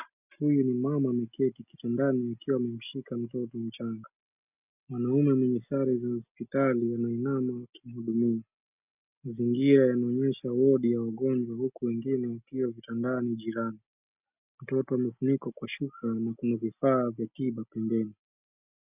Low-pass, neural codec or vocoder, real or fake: 3.6 kHz; none; real